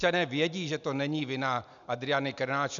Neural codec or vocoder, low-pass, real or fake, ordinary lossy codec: none; 7.2 kHz; real; MP3, 96 kbps